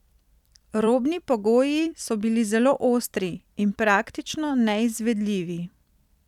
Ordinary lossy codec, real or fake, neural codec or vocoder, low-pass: none; fake; vocoder, 44.1 kHz, 128 mel bands every 256 samples, BigVGAN v2; 19.8 kHz